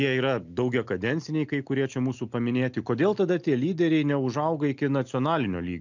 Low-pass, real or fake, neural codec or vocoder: 7.2 kHz; real; none